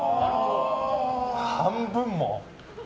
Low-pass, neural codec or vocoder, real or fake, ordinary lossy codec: none; none; real; none